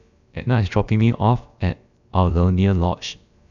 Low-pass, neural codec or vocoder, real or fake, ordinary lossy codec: 7.2 kHz; codec, 16 kHz, about 1 kbps, DyCAST, with the encoder's durations; fake; none